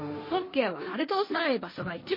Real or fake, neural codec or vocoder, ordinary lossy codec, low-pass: fake; codec, 16 kHz, 1.1 kbps, Voila-Tokenizer; MP3, 24 kbps; 5.4 kHz